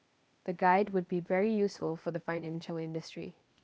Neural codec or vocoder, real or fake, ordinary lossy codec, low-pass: codec, 16 kHz, 0.8 kbps, ZipCodec; fake; none; none